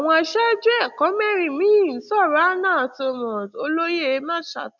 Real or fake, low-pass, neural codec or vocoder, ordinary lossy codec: real; 7.2 kHz; none; none